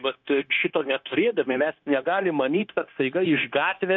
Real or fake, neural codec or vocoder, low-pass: fake; codec, 16 kHz, 0.9 kbps, LongCat-Audio-Codec; 7.2 kHz